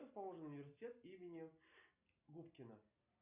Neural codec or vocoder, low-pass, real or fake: none; 3.6 kHz; real